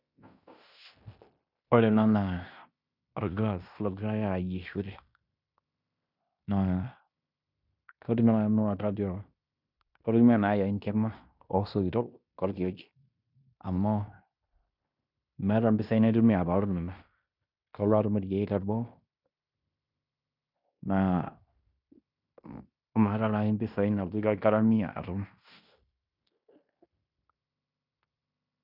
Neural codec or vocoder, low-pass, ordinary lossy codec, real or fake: codec, 16 kHz in and 24 kHz out, 0.9 kbps, LongCat-Audio-Codec, fine tuned four codebook decoder; 5.4 kHz; none; fake